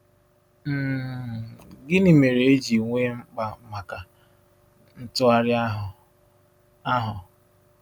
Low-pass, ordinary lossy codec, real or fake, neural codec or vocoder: 19.8 kHz; none; real; none